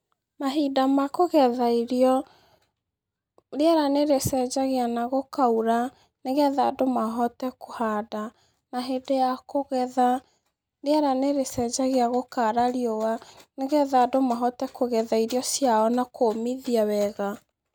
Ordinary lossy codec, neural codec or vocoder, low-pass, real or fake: none; none; none; real